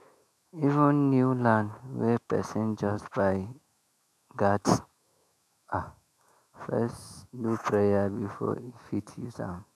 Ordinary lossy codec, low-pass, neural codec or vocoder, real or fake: none; 14.4 kHz; autoencoder, 48 kHz, 128 numbers a frame, DAC-VAE, trained on Japanese speech; fake